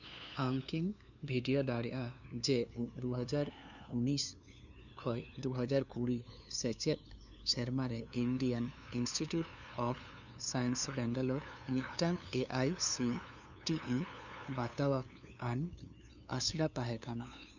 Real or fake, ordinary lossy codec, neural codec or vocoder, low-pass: fake; none; codec, 16 kHz, 2 kbps, FunCodec, trained on LibriTTS, 25 frames a second; 7.2 kHz